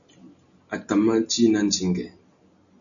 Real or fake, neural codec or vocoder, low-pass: real; none; 7.2 kHz